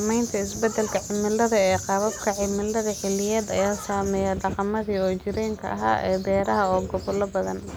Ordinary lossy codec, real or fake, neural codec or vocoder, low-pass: none; real; none; none